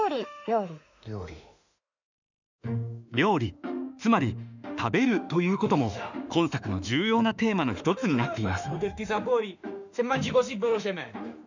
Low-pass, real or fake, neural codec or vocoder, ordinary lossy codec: 7.2 kHz; fake; autoencoder, 48 kHz, 32 numbers a frame, DAC-VAE, trained on Japanese speech; none